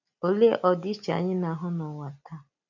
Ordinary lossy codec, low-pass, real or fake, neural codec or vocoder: none; 7.2 kHz; real; none